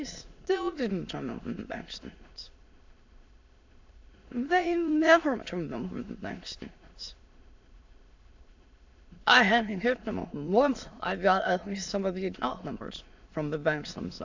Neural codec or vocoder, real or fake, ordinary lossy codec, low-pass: autoencoder, 22.05 kHz, a latent of 192 numbers a frame, VITS, trained on many speakers; fake; AAC, 48 kbps; 7.2 kHz